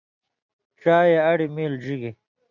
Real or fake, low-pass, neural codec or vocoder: real; 7.2 kHz; none